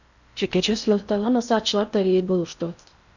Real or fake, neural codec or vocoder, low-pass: fake; codec, 16 kHz in and 24 kHz out, 0.6 kbps, FocalCodec, streaming, 4096 codes; 7.2 kHz